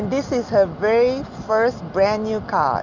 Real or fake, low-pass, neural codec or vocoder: real; 7.2 kHz; none